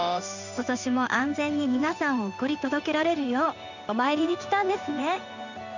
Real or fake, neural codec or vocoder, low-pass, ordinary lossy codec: fake; codec, 16 kHz in and 24 kHz out, 1 kbps, XY-Tokenizer; 7.2 kHz; none